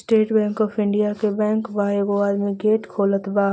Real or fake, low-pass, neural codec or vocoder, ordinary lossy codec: real; none; none; none